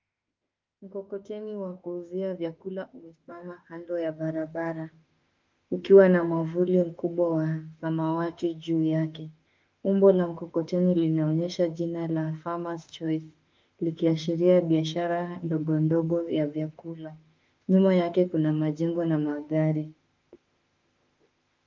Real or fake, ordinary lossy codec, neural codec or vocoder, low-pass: fake; Opus, 24 kbps; autoencoder, 48 kHz, 32 numbers a frame, DAC-VAE, trained on Japanese speech; 7.2 kHz